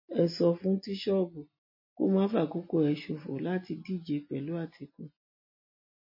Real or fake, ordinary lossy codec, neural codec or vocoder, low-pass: real; MP3, 24 kbps; none; 5.4 kHz